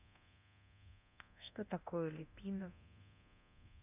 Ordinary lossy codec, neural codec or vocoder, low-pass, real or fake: none; codec, 24 kHz, 0.9 kbps, DualCodec; 3.6 kHz; fake